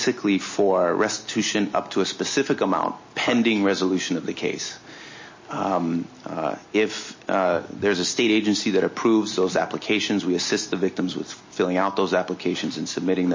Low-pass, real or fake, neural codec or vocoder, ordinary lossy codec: 7.2 kHz; real; none; MP3, 32 kbps